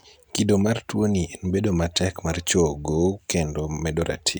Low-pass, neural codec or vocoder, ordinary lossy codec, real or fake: none; none; none; real